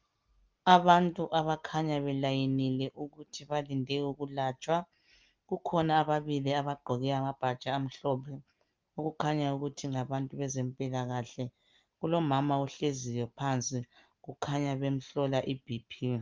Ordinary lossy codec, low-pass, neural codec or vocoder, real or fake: Opus, 32 kbps; 7.2 kHz; none; real